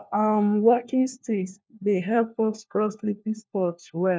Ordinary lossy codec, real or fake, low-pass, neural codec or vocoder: none; fake; none; codec, 16 kHz, 1 kbps, FunCodec, trained on LibriTTS, 50 frames a second